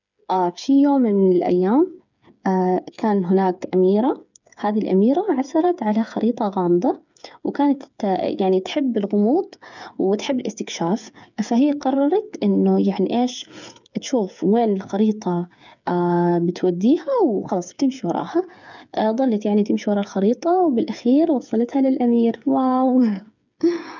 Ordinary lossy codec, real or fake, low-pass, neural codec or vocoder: none; fake; 7.2 kHz; codec, 16 kHz, 8 kbps, FreqCodec, smaller model